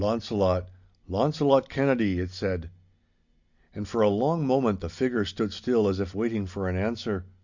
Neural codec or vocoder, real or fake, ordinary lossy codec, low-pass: none; real; Opus, 64 kbps; 7.2 kHz